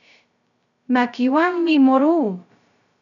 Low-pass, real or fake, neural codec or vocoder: 7.2 kHz; fake; codec, 16 kHz, 0.2 kbps, FocalCodec